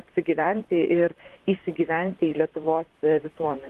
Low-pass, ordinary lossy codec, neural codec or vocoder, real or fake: 14.4 kHz; Opus, 24 kbps; vocoder, 44.1 kHz, 128 mel bands, Pupu-Vocoder; fake